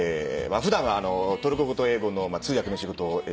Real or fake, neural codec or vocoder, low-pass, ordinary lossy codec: real; none; none; none